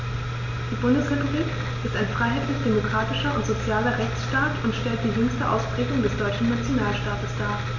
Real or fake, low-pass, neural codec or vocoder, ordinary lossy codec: real; 7.2 kHz; none; none